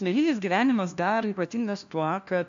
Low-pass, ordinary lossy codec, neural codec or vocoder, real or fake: 7.2 kHz; AAC, 64 kbps; codec, 16 kHz, 1 kbps, FunCodec, trained on LibriTTS, 50 frames a second; fake